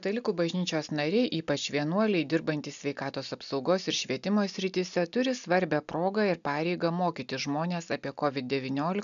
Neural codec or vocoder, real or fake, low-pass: none; real; 7.2 kHz